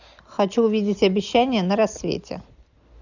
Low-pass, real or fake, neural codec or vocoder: 7.2 kHz; real; none